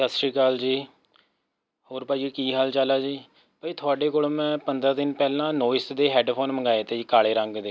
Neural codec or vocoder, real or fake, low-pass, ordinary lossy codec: none; real; none; none